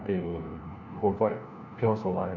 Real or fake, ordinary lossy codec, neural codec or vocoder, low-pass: fake; none; codec, 16 kHz, 1 kbps, FunCodec, trained on LibriTTS, 50 frames a second; 7.2 kHz